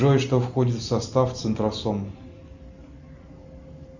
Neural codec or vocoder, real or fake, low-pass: none; real; 7.2 kHz